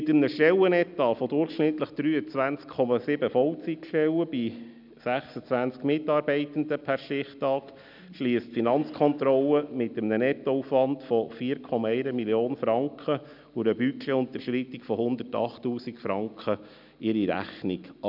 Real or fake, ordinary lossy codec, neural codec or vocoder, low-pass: real; none; none; 5.4 kHz